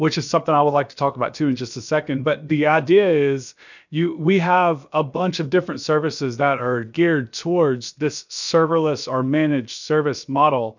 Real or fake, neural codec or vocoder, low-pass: fake; codec, 16 kHz, about 1 kbps, DyCAST, with the encoder's durations; 7.2 kHz